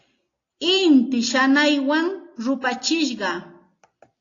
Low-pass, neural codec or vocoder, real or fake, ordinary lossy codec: 7.2 kHz; none; real; AAC, 32 kbps